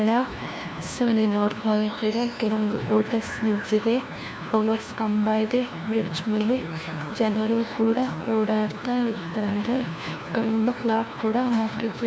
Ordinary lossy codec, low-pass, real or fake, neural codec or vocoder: none; none; fake; codec, 16 kHz, 1 kbps, FunCodec, trained on LibriTTS, 50 frames a second